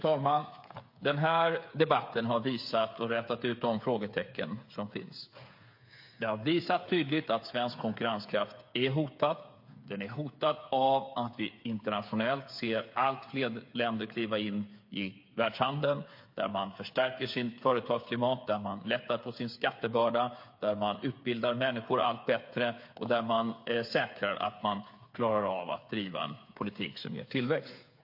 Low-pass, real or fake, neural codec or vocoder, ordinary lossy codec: 5.4 kHz; fake; codec, 16 kHz, 8 kbps, FreqCodec, smaller model; MP3, 32 kbps